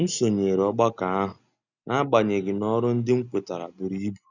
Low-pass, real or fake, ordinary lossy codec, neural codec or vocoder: 7.2 kHz; real; none; none